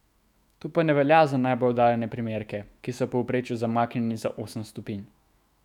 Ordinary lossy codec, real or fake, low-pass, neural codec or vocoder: none; fake; 19.8 kHz; autoencoder, 48 kHz, 128 numbers a frame, DAC-VAE, trained on Japanese speech